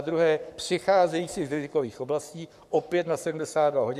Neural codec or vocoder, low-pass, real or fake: codec, 44.1 kHz, 7.8 kbps, Pupu-Codec; 14.4 kHz; fake